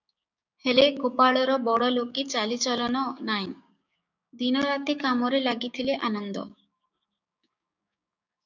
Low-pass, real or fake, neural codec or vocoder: 7.2 kHz; fake; codec, 16 kHz, 6 kbps, DAC